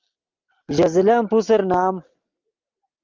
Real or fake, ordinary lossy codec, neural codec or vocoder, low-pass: real; Opus, 24 kbps; none; 7.2 kHz